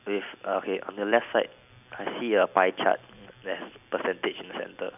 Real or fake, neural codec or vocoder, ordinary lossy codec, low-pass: real; none; none; 3.6 kHz